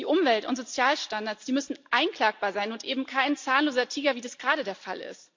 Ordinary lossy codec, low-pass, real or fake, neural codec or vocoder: MP3, 48 kbps; 7.2 kHz; real; none